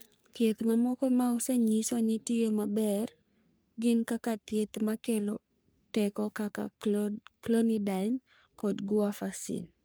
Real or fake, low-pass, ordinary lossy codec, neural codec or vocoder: fake; none; none; codec, 44.1 kHz, 3.4 kbps, Pupu-Codec